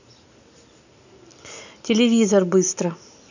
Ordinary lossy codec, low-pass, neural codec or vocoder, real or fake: none; 7.2 kHz; none; real